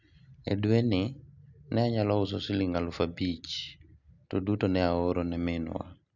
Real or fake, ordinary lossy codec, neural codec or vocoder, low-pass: real; none; none; 7.2 kHz